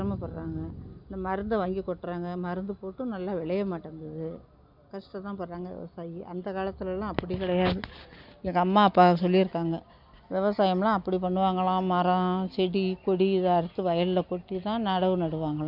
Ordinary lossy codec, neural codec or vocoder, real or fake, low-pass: none; none; real; 5.4 kHz